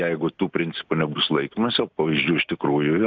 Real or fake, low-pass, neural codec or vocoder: real; 7.2 kHz; none